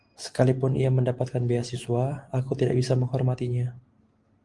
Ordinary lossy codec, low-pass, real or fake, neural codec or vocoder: Opus, 24 kbps; 10.8 kHz; real; none